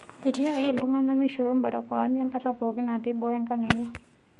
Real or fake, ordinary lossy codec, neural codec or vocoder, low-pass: fake; MP3, 48 kbps; codec, 32 kHz, 1.9 kbps, SNAC; 14.4 kHz